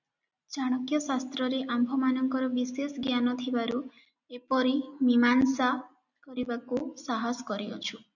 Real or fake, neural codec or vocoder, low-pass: real; none; 7.2 kHz